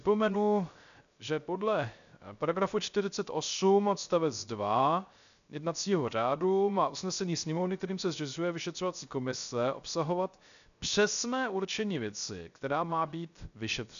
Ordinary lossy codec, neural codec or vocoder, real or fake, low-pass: MP3, 96 kbps; codec, 16 kHz, 0.3 kbps, FocalCodec; fake; 7.2 kHz